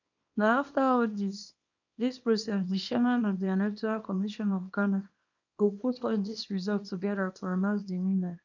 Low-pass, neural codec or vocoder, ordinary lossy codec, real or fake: 7.2 kHz; codec, 24 kHz, 0.9 kbps, WavTokenizer, small release; none; fake